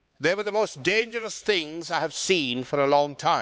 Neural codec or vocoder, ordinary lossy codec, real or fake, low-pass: codec, 16 kHz, 4 kbps, X-Codec, HuBERT features, trained on LibriSpeech; none; fake; none